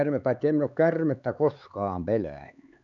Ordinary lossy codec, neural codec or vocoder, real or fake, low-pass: none; codec, 16 kHz, 4 kbps, X-Codec, HuBERT features, trained on LibriSpeech; fake; 7.2 kHz